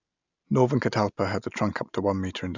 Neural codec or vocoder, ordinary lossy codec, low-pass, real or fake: none; none; 7.2 kHz; real